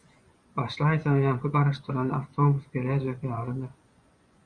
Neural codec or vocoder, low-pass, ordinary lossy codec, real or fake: vocoder, 24 kHz, 100 mel bands, Vocos; 9.9 kHz; Opus, 64 kbps; fake